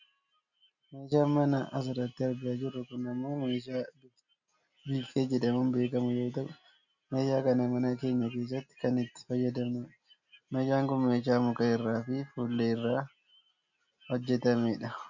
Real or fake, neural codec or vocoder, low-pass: real; none; 7.2 kHz